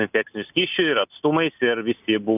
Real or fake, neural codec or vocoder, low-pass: real; none; 3.6 kHz